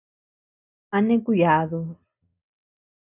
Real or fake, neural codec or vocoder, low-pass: real; none; 3.6 kHz